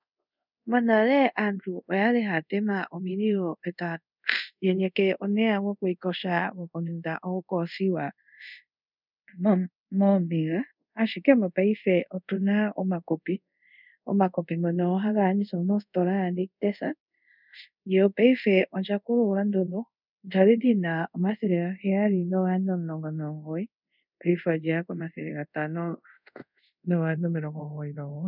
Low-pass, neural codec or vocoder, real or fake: 5.4 kHz; codec, 24 kHz, 0.5 kbps, DualCodec; fake